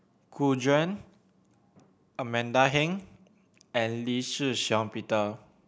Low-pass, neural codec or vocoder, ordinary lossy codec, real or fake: none; none; none; real